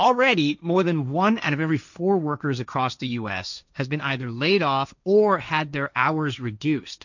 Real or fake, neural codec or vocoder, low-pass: fake; codec, 16 kHz, 1.1 kbps, Voila-Tokenizer; 7.2 kHz